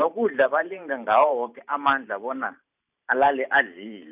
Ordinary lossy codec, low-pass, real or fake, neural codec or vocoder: none; 3.6 kHz; fake; vocoder, 44.1 kHz, 128 mel bands every 512 samples, BigVGAN v2